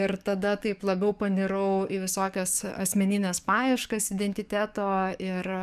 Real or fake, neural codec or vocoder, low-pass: fake; codec, 44.1 kHz, 7.8 kbps, DAC; 14.4 kHz